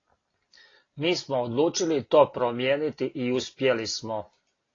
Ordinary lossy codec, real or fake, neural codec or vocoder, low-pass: AAC, 32 kbps; real; none; 7.2 kHz